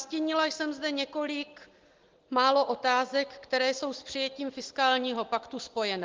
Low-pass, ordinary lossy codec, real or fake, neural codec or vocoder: 7.2 kHz; Opus, 16 kbps; real; none